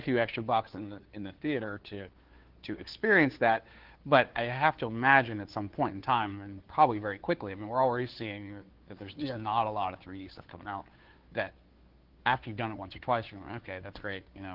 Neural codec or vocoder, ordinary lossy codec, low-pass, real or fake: codec, 16 kHz, 2 kbps, FunCodec, trained on Chinese and English, 25 frames a second; Opus, 16 kbps; 5.4 kHz; fake